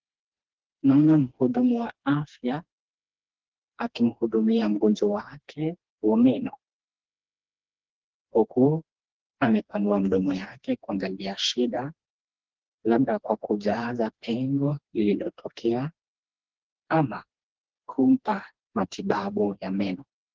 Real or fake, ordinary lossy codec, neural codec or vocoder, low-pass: fake; Opus, 16 kbps; codec, 16 kHz, 2 kbps, FreqCodec, smaller model; 7.2 kHz